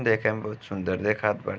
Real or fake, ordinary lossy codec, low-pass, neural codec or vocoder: real; none; none; none